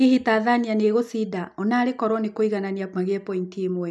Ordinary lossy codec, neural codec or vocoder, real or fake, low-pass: none; none; real; none